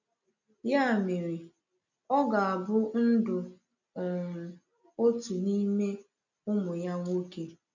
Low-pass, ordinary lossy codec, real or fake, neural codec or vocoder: 7.2 kHz; none; real; none